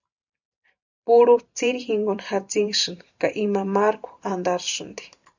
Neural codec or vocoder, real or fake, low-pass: vocoder, 44.1 kHz, 128 mel bands every 512 samples, BigVGAN v2; fake; 7.2 kHz